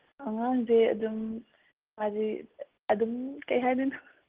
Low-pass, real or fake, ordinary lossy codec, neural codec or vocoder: 3.6 kHz; real; Opus, 16 kbps; none